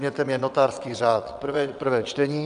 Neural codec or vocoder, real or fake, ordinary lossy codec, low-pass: vocoder, 22.05 kHz, 80 mel bands, WaveNeXt; fake; AAC, 96 kbps; 9.9 kHz